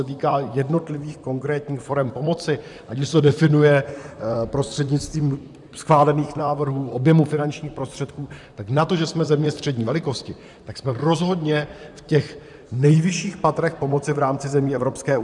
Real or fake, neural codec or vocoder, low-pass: fake; vocoder, 44.1 kHz, 128 mel bands, Pupu-Vocoder; 10.8 kHz